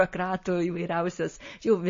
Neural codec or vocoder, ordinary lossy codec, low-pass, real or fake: none; MP3, 32 kbps; 7.2 kHz; real